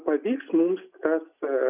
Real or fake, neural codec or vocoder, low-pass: real; none; 3.6 kHz